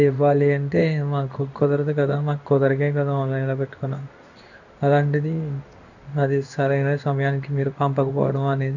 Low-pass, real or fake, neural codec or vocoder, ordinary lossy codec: 7.2 kHz; fake; codec, 16 kHz in and 24 kHz out, 1 kbps, XY-Tokenizer; none